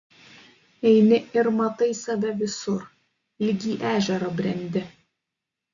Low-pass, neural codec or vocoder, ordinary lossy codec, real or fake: 7.2 kHz; none; Opus, 64 kbps; real